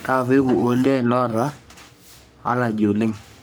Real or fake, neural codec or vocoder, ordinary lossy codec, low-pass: fake; codec, 44.1 kHz, 3.4 kbps, Pupu-Codec; none; none